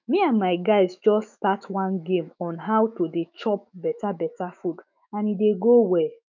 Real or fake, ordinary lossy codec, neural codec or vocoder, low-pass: fake; none; autoencoder, 48 kHz, 128 numbers a frame, DAC-VAE, trained on Japanese speech; 7.2 kHz